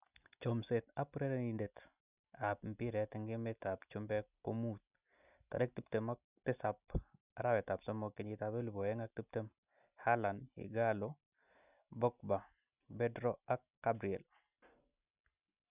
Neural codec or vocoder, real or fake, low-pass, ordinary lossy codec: none; real; 3.6 kHz; none